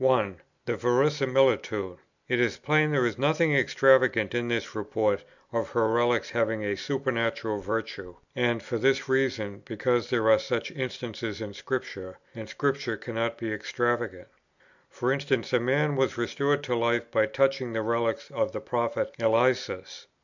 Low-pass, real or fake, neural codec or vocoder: 7.2 kHz; real; none